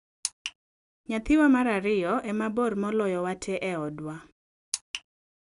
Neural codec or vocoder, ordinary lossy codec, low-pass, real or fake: none; none; 10.8 kHz; real